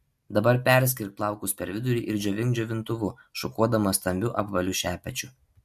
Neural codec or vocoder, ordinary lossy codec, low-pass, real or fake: none; MP3, 64 kbps; 14.4 kHz; real